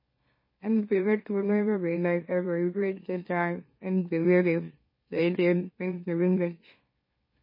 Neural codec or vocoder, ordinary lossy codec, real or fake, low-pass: autoencoder, 44.1 kHz, a latent of 192 numbers a frame, MeloTTS; MP3, 24 kbps; fake; 5.4 kHz